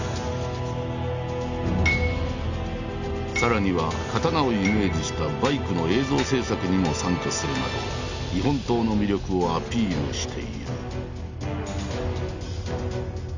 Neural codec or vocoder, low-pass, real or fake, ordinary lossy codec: none; 7.2 kHz; real; Opus, 64 kbps